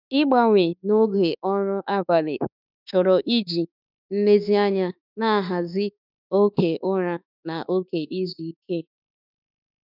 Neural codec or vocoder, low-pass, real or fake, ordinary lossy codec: autoencoder, 48 kHz, 32 numbers a frame, DAC-VAE, trained on Japanese speech; 5.4 kHz; fake; none